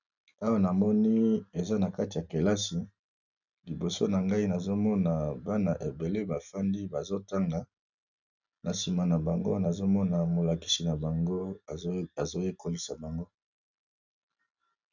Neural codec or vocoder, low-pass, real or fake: none; 7.2 kHz; real